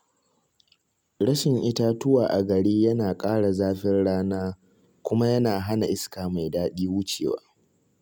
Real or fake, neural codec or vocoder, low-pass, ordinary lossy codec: real; none; none; none